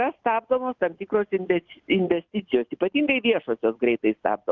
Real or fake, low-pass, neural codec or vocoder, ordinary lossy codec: real; 7.2 kHz; none; Opus, 24 kbps